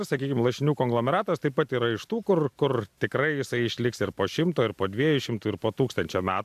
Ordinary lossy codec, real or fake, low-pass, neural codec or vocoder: AAC, 96 kbps; real; 14.4 kHz; none